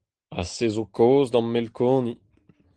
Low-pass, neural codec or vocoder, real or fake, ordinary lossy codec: 9.9 kHz; none; real; Opus, 16 kbps